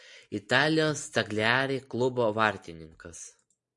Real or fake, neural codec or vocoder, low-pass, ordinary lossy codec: real; none; 10.8 kHz; MP3, 64 kbps